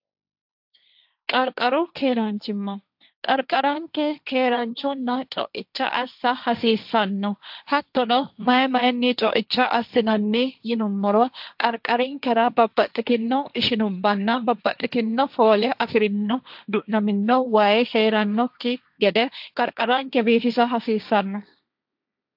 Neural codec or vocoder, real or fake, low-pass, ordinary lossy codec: codec, 16 kHz, 1.1 kbps, Voila-Tokenizer; fake; 5.4 kHz; AAC, 48 kbps